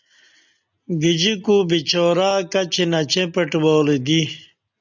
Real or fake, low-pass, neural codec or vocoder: real; 7.2 kHz; none